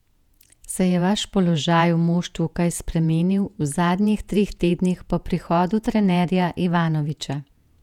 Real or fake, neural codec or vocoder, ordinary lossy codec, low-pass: fake; vocoder, 48 kHz, 128 mel bands, Vocos; none; 19.8 kHz